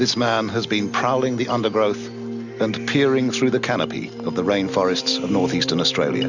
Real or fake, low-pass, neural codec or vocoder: real; 7.2 kHz; none